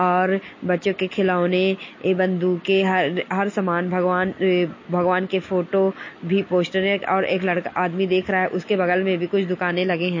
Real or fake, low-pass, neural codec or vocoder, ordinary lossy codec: real; 7.2 kHz; none; MP3, 32 kbps